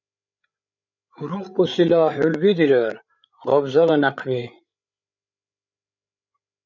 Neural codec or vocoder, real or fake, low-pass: codec, 16 kHz, 8 kbps, FreqCodec, larger model; fake; 7.2 kHz